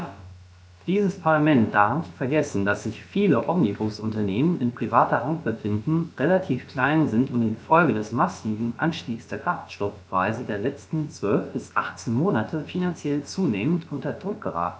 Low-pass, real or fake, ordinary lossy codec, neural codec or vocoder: none; fake; none; codec, 16 kHz, about 1 kbps, DyCAST, with the encoder's durations